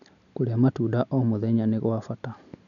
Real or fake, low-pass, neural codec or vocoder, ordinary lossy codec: real; 7.2 kHz; none; none